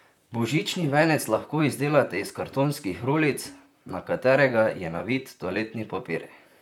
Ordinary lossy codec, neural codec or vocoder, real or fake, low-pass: none; vocoder, 44.1 kHz, 128 mel bands, Pupu-Vocoder; fake; 19.8 kHz